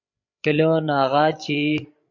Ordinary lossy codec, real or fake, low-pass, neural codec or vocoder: MP3, 64 kbps; fake; 7.2 kHz; codec, 16 kHz, 16 kbps, FreqCodec, larger model